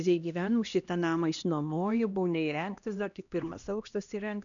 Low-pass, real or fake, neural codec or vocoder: 7.2 kHz; fake; codec, 16 kHz, 1 kbps, X-Codec, HuBERT features, trained on LibriSpeech